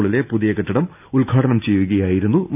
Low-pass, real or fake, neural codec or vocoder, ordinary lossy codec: 3.6 kHz; real; none; none